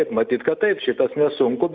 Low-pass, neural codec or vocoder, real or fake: 7.2 kHz; none; real